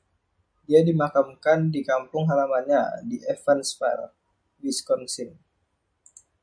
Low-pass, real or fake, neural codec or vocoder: 9.9 kHz; real; none